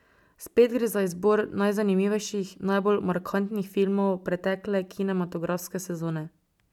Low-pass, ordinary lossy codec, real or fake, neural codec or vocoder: 19.8 kHz; none; real; none